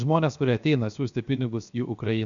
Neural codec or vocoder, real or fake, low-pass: codec, 16 kHz, 0.7 kbps, FocalCodec; fake; 7.2 kHz